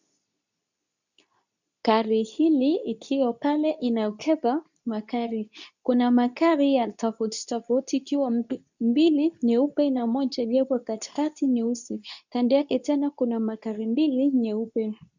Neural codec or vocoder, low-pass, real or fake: codec, 24 kHz, 0.9 kbps, WavTokenizer, medium speech release version 2; 7.2 kHz; fake